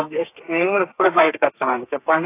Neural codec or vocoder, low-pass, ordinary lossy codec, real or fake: codec, 32 kHz, 1.9 kbps, SNAC; 3.6 kHz; AAC, 24 kbps; fake